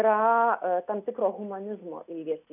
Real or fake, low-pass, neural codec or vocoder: real; 3.6 kHz; none